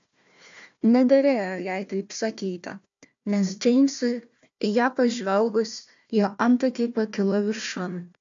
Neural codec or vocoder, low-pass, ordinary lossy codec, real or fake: codec, 16 kHz, 1 kbps, FunCodec, trained on Chinese and English, 50 frames a second; 7.2 kHz; AAC, 64 kbps; fake